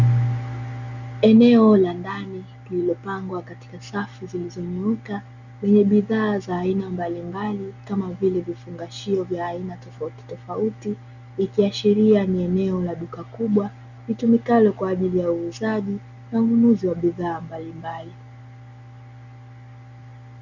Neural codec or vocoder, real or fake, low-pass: none; real; 7.2 kHz